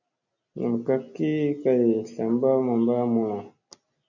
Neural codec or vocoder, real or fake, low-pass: none; real; 7.2 kHz